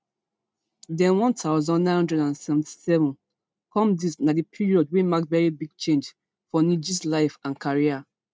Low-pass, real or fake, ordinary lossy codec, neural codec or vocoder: none; real; none; none